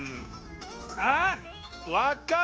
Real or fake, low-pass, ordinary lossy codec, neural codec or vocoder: fake; none; none; codec, 16 kHz, 0.9 kbps, LongCat-Audio-Codec